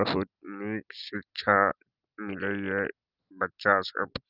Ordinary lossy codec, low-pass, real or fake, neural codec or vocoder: Opus, 32 kbps; 5.4 kHz; real; none